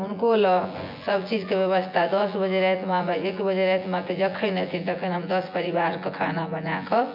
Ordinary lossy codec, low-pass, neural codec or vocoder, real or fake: MP3, 48 kbps; 5.4 kHz; vocoder, 24 kHz, 100 mel bands, Vocos; fake